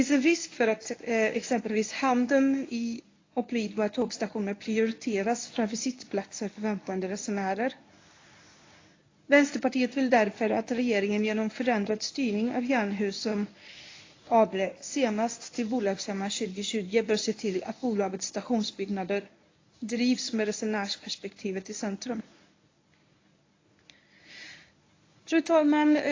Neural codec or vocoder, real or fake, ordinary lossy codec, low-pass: codec, 24 kHz, 0.9 kbps, WavTokenizer, medium speech release version 1; fake; AAC, 32 kbps; 7.2 kHz